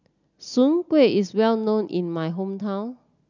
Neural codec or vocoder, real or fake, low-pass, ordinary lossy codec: none; real; 7.2 kHz; none